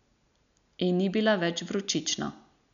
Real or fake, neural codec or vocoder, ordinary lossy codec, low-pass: real; none; none; 7.2 kHz